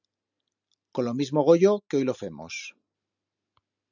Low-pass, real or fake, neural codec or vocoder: 7.2 kHz; real; none